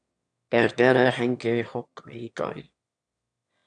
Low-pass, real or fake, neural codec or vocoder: 9.9 kHz; fake; autoencoder, 22.05 kHz, a latent of 192 numbers a frame, VITS, trained on one speaker